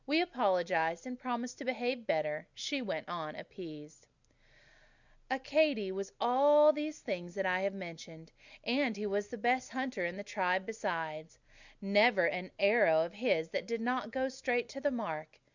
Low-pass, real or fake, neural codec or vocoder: 7.2 kHz; real; none